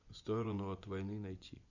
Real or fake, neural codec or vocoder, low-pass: fake; vocoder, 24 kHz, 100 mel bands, Vocos; 7.2 kHz